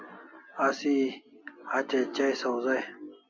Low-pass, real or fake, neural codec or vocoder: 7.2 kHz; real; none